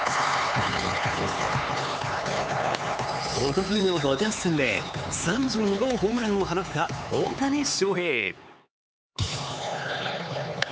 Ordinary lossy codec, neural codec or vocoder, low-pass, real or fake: none; codec, 16 kHz, 4 kbps, X-Codec, HuBERT features, trained on LibriSpeech; none; fake